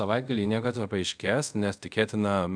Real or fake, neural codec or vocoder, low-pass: fake; codec, 24 kHz, 0.5 kbps, DualCodec; 9.9 kHz